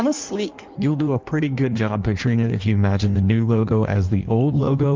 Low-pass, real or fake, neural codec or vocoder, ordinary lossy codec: 7.2 kHz; fake; codec, 16 kHz in and 24 kHz out, 1.1 kbps, FireRedTTS-2 codec; Opus, 32 kbps